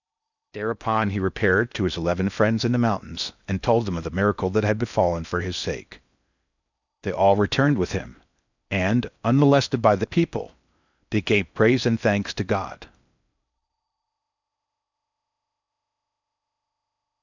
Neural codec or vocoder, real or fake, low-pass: codec, 16 kHz in and 24 kHz out, 0.6 kbps, FocalCodec, streaming, 2048 codes; fake; 7.2 kHz